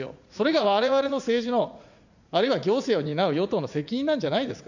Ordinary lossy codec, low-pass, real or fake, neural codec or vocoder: none; 7.2 kHz; fake; vocoder, 44.1 kHz, 80 mel bands, Vocos